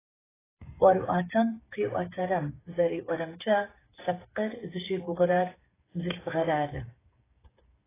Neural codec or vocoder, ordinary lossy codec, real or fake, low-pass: codec, 16 kHz, 8 kbps, FreqCodec, smaller model; AAC, 16 kbps; fake; 3.6 kHz